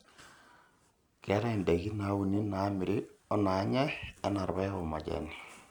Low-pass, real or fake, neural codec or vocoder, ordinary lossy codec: 19.8 kHz; real; none; none